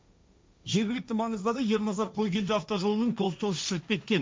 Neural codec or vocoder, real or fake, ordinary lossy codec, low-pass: codec, 16 kHz, 1.1 kbps, Voila-Tokenizer; fake; none; none